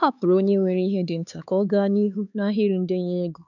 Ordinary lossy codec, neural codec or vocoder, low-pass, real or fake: none; codec, 16 kHz, 4 kbps, X-Codec, HuBERT features, trained on LibriSpeech; 7.2 kHz; fake